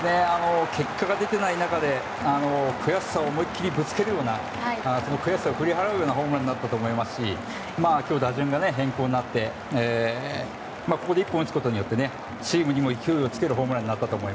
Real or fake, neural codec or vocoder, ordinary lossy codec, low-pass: real; none; none; none